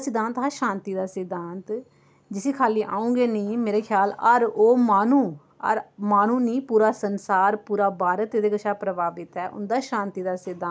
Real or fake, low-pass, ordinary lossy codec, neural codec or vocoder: real; none; none; none